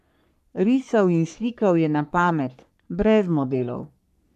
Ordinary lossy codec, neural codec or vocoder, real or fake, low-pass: none; codec, 44.1 kHz, 3.4 kbps, Pupu-Codec; fake; 14.4 kHz